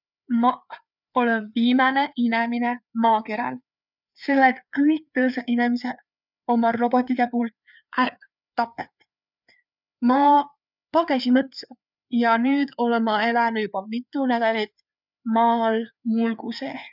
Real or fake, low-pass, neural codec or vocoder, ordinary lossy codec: fake; 5.4 kHz; codec, 16 kHz, 4 kbps, FreqCodec, larger model; none